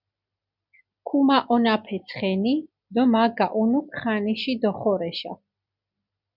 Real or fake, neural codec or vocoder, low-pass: real; none; 5.4 kHz